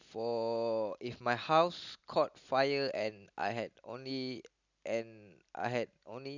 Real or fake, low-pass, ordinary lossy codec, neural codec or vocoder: real; 7.2 kHz; none; none